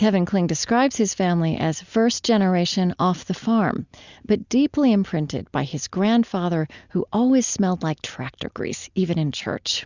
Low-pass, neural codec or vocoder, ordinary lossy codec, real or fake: 7.2 kHz; none; Opus, 64 kbps; real